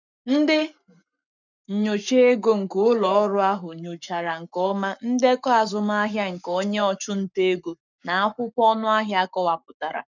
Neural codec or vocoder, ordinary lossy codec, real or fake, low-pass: codec, 44.1 kHz, 7.8 kbps, Pupu-Codec; none; fake; 7.2 kHz